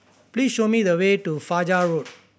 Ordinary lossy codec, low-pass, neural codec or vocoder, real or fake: none; none; none; real